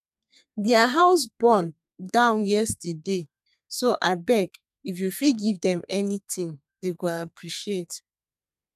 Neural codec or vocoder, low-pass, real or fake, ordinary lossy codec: codec, 32 kHz, 1.9 kbps, SNAC; 14.4 kHz; fake; none